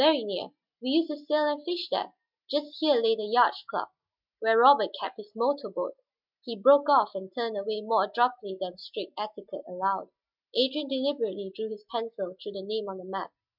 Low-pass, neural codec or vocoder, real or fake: 5.4 kHz; none; real